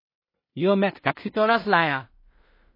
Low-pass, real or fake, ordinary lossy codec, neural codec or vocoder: 5.4 kHz; fake; MP3, 24 kbps; codec, 16 kHz in and 24 kHz out, 0.4 kbps, LongCat-Audio-Codec, two codebook decoder